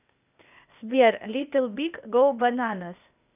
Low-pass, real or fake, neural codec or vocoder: 3.6 kHz; fake; codec, 16 kHz, 0.8 kbps, ZipCodec